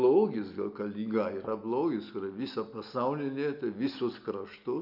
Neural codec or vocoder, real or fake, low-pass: none; real; 5.4 kHz